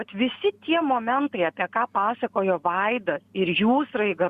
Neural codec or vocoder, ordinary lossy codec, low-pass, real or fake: none; Opus, 64 kbps; 14.4 kHz; real